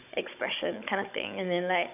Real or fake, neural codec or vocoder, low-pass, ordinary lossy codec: fake; codec, 16 kHz, 16 kbps, FunCodec, trained on Chinese and English, 50 frames a second; 3.6 kHz; none